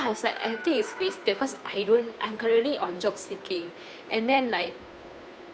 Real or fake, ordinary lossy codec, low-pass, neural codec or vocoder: fake; none; none; codec, 16 kHz, 2 kbps, FunCodec, trained on Chinese and English, 25 frames a second